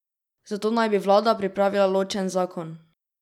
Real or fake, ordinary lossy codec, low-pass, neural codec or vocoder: real; none; 19.8 kHz; none